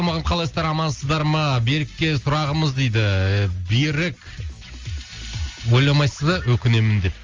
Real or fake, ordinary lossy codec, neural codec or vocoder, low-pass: real; Opus, 32 kbps; none; 7.2 kHz